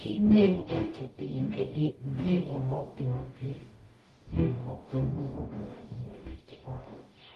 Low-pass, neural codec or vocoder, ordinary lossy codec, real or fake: 14.4 kHz; codec, 44.1 kHz, 0.9 kbps, DAC; Opus, 24 kbps; fake